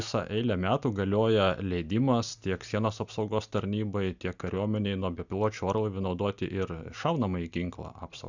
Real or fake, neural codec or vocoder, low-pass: real; none; 7.2 kHz